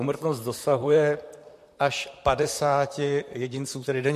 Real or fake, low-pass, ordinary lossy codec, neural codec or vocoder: fake; 14.4 kHz; MP3, 64 kbps; vocoder, 44.1 kHz, 128 mel bands, Pupu-Vocoder